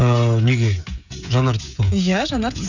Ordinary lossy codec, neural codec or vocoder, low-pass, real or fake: none; codec, 16 kHz, 16 kbps, FreqCodec, smaller model; 7.2 kHz; fake